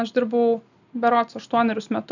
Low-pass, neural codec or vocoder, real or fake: 7.2 kHz; none; real